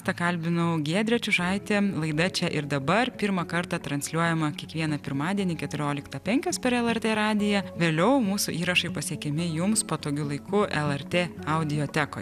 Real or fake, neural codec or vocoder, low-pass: real; none; 14.4 kHz